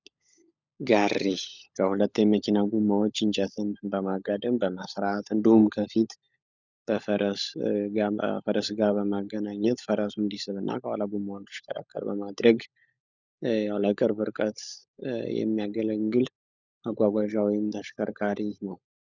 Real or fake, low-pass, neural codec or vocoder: fake; 7.2 kHz; codec, 16 kHz, 16 kbps, FunCodec, trained on LibriTTS, 50 frames a second